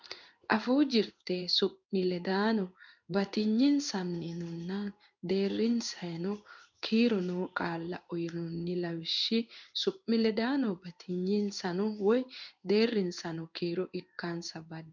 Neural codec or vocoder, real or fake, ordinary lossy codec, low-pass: codec, 16 kHz in and 24 kHz out, 1 kbps, XY-Tokenizer; fake; MP3, 64 kbps; 7.2 kHz